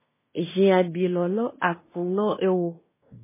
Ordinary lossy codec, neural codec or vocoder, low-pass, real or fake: MP3, 16 kbps; codec, 16 kHz in and 24 kHz out, 0.9 kbps, LongCat-Audio-Codec, fine tuned four codebook decoder; 3.6 kHz; fake